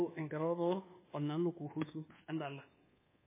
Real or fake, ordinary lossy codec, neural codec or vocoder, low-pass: fake; MP3, 16 kbps; codec, 24 kHz, 1.2 kbps, DualCodec; 3.6 kHz